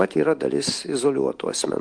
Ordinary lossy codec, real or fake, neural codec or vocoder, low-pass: Opus, 24 kbps; real; none; 9.9 kHz